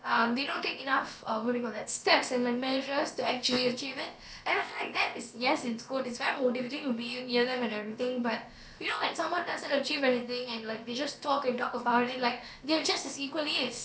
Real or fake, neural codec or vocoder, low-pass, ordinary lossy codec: fake; codec, 16 kHz, about 1 kbps, DyCAST, with the encoder's durations; none; none